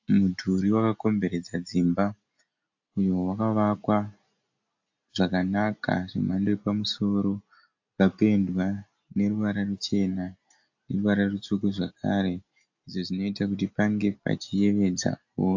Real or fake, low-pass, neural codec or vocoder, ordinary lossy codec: real; 7.2 kHz; none; AAC, 48 kbps